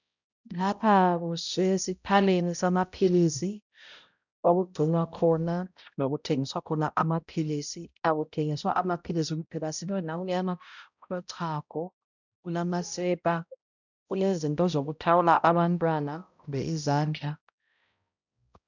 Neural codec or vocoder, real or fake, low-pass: codec, 16 kHz, 0.5 kbps, X-Codec, HuBERT features, trained on balanced general audio; fake; 7.2 kHz